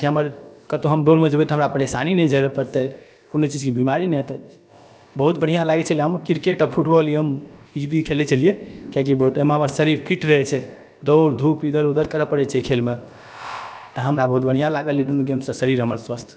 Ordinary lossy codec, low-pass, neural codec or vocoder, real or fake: none; none; codec, 16 kHz, about 1 kbps, DyCAST, with the encoder's durations; fake